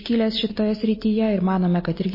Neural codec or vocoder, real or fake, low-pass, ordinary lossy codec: none; real; 5.4 kHz; MP3, 24 kbps